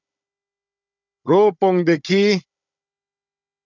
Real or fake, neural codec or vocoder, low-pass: fake; codec, 16 kHz, 16 kbps, FunCodec, trained on Chinese and English, 50 frames a second; 7.2 kHz